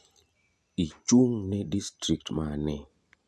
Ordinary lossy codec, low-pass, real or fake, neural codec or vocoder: none; none; real; none